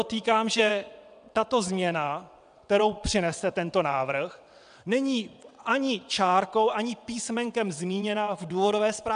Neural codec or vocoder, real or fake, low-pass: vocoder, 22.05 kHz, 80 mel bands, WaveNeXt; fake; 9.9 kHz